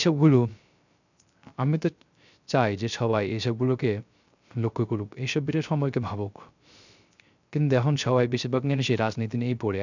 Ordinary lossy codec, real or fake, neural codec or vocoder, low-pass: none; fake; codec, 16 kHz, 0.3 kbps, FocalCodec; 7.2 kHz